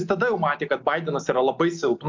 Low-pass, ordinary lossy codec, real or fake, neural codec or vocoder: 7.2 kHz; MP3, 64 kbps; real; none